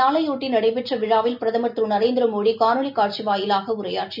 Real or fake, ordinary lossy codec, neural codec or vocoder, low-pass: real; none; none; 5.4 kHz